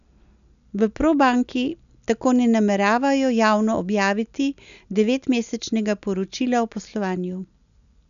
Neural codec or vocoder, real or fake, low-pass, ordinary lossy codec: none; real; 7.2 kHz; none